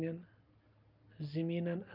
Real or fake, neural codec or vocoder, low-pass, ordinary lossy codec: real; none; 5.4 kHz; Opus, 24 kbps